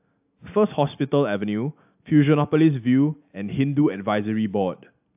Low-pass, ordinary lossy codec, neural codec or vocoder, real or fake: 3.6 kHz; AAC, 32 kbps; none; real